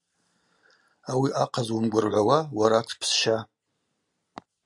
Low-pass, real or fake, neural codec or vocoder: 10.8 kHz; real; none